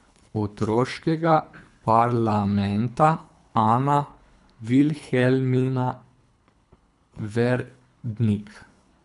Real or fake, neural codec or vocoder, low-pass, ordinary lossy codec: fake; codec, 24 kHz, 3 kbps, HILCodec; 10.8 kHz; MP3, 96 kbps